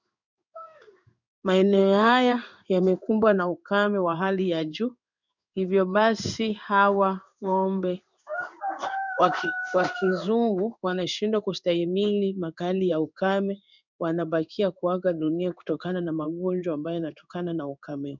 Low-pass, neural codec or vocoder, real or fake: 7.2 kHz; codec, 16 kHz in and 24 kHz out, 1 kbps, XY-Tokenizer; fake